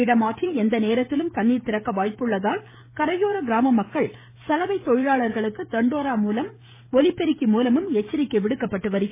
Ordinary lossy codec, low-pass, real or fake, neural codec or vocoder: MP3, 16 kbps; 3.6 kHz; fake; codec, 16 kHz, 16 kbps, FreqCodec, smaller model